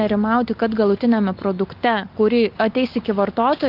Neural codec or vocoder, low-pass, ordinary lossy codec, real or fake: none; 5.4 kHz; Opus, 24 kbps; real